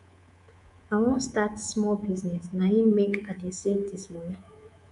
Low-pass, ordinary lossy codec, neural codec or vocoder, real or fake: 10.8 kHz; MP3, 64 kbps; codec, 24 kHz, 3.1 kbps, DualCodec; fake